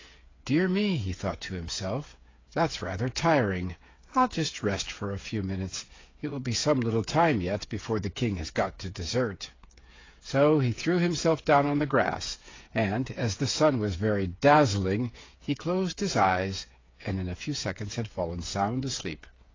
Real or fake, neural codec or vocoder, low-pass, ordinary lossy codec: fake; codec, 16 kHz, 8 kbps, FreqCodec, smaller model; 7.2 kHz; AAC, 32 kbps